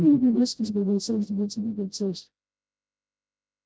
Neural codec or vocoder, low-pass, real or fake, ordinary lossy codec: codec, 16 kHz, 0.5 kbps, FreqCodec, smaller model; none; fake; none